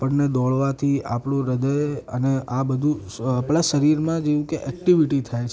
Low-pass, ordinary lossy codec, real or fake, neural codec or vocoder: none; none; real; none